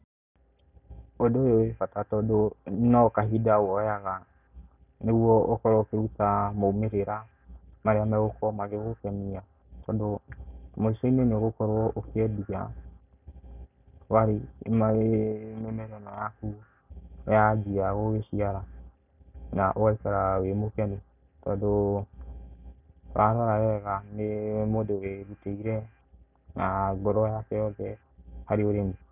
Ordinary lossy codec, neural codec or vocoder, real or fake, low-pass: none; none; real; 3.6 kHz